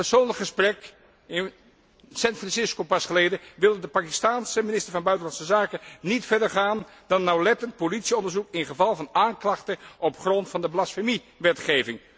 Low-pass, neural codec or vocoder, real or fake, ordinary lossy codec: none; none; real; none